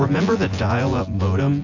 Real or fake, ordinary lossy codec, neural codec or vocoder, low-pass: fake; AAC, 48 kbps; vocoder, 24 kHz, 100 mel bands, Vocos; 7.2 kHz